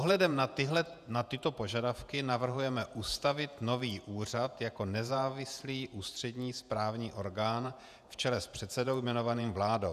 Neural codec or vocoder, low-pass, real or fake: vocoder, 48 kHz, 128 mel bands, Vocos; 14.4 kHz; fake